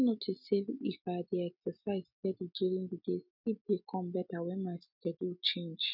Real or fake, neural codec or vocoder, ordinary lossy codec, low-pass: real; none; none; 5.4 kHz